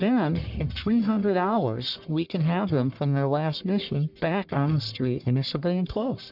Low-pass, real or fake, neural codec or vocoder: 5.4 kHz; fake; codec, 44.1 kHz, 1.7 kbps, Pupu-Codec